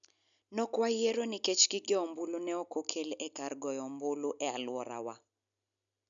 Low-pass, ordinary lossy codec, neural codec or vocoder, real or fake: 7.2 kHz; none; none; real